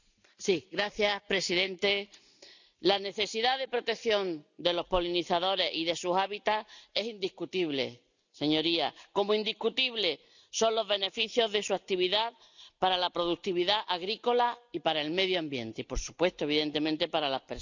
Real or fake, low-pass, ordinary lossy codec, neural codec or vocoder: real; 7.2 kHz; none; none